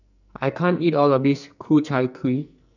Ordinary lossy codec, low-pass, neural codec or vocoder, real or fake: none; 7.2 kHz; codec, 44.1 kHz, 2.6 kbps, SNAC; fake